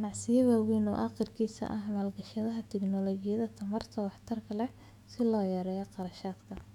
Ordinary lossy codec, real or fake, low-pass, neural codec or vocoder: none; fake; 19.8 kHz; autoencoder, 48 kHz, 128 numbers a frame, DAC-VAE, trained on Japanese speech